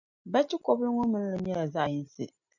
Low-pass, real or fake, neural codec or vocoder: 7.2 kHz; real; none